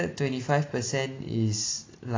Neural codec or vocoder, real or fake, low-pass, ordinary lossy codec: none; real; 7.2 kHz; AAC, 32 kbps